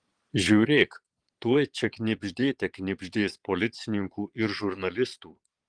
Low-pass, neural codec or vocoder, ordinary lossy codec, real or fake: 9.9 kHz; vocoder, 22.05 kHz, 80 mel bands, Vocos; Opus, 24 kbps; fake